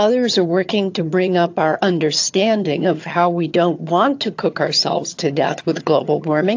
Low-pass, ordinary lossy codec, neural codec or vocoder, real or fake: 7.2 kHz; AAC, 48 kbps; vocoder, 22.05 kHz, 80 mel bands, HiFi-GAN; fake